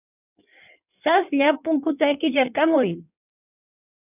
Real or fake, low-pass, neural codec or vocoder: fake; 3.6 kHz; codec, 16 kHz in and 24 kHz out, 1.1 kbps, FireRedTTS-2 codec